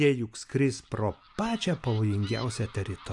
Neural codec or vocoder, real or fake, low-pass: none; real; 10.8 kHz